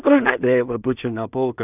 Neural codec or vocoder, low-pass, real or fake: codec, 16 kHz in and 24 kHz out, 0.4 kbps, LongCat-Audio-Codec, two codebook decoder; 3.6 kHz; fake